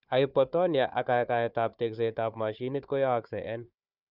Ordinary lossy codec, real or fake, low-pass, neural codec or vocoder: none; fake; 5.4 kHz; codec, 16 kHz, 4 kbps, FunCodec, trained on Chinese and English, 50 frames a second